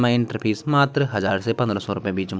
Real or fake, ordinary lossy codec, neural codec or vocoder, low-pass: real; none; none; none